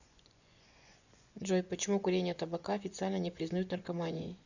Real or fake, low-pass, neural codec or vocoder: fake; 7.2 kHz; vocoder, 24 kHz, 100 mel bands, Vocos